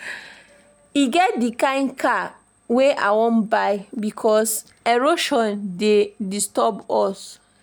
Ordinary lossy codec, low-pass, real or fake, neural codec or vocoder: none; none; real; none